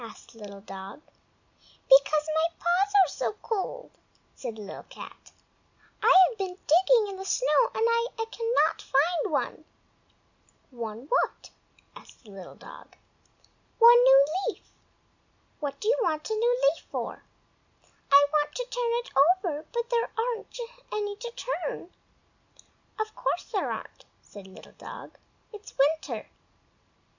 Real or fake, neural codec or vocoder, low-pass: real; none; 7.2 kHz